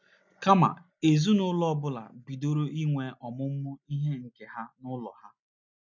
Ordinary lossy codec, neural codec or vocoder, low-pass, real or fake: none; none; 7.2 kHz; real